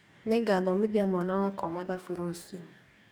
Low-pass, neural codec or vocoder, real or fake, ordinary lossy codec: none; codec, 44.1 kHz, 2.6 kbps, DAC; fake; none